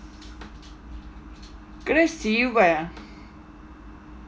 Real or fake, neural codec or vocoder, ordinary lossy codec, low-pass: real; none; none; none